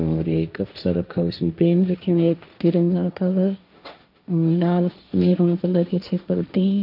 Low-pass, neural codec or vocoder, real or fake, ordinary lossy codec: 5.4 kHz; codec, 16 kHz, 1.1 kbps, Voila-Tokenizer; fake; none